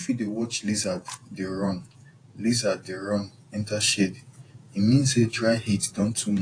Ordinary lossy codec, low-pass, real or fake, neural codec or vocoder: AAC, 48 kbps; 9.9 kHz; fake; vocoder, 48 kHz, 128 mel bands, Vocos